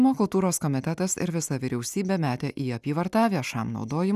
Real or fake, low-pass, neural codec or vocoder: real; 14.4 kHz; none